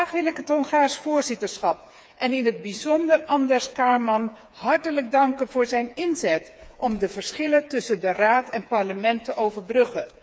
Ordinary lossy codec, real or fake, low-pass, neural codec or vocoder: none; fake; none; codec, 16 kHz, 4 kbps, FreqCodec, smaller model